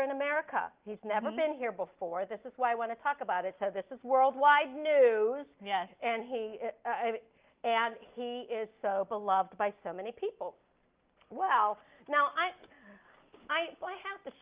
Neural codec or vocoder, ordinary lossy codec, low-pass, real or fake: none; Opus, 32 kbps; 3.6 kHz; real